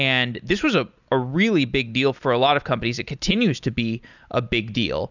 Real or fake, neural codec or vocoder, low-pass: real; none; 7.2 kHz